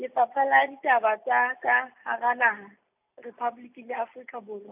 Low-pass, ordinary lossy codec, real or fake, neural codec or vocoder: 3.6 kHz; AAC, 32 kbps; real; none